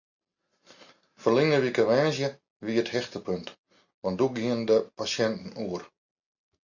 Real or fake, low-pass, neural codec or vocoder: real; 7.2 kHz; none